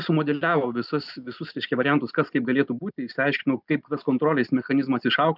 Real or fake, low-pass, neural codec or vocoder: real; 5.4 kHz; none